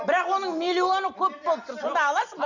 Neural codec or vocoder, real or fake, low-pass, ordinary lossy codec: none; real; 7.2 kHz; none